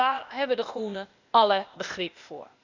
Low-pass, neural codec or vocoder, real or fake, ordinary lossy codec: 7.2 kHz; codec, 16 kHz, 0.8 kbps, ZipCodec; fake; Opus, 64 kbps